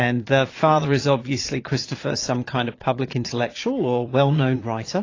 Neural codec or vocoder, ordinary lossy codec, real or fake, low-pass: vocoder, 22.05 kHz, 80 mel bands, Vocos; AAC, 32 kbps; fake; 7.2 kHz